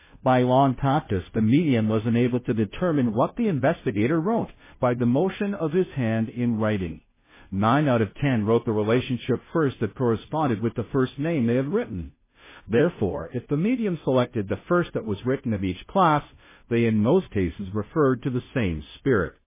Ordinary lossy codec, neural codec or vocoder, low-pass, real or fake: MP3, 16 kbps; codec, 16 kHz, 0.5 kbps, FunCodec, trained on Chinese and English, 25 frames a second; 3.6 kHz; fake